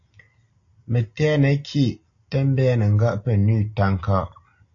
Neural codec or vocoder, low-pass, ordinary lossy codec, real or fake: none; 7.2 kHz; AAC, 48 kbps; real